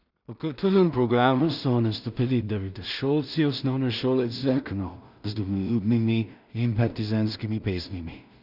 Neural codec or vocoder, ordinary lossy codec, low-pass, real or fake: codec, 16 kHz in and 24 kHz out, 0.4 kbps, LongCat-Audio-Codec, two codebook decoder; none; 5.4 kHz; fake